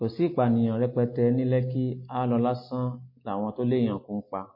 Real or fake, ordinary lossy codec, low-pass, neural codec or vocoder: real; MP3, 24 kbps; 5.4 kHz; none